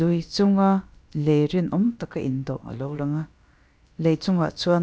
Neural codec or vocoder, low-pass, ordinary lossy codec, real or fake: codec, 16 kHz, about 1 kbps, DyCAST, with the encoder's durations; none; none; fake